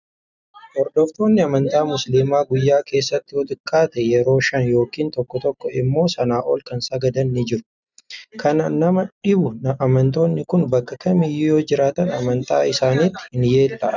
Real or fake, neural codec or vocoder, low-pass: real; none; 7.2 kHz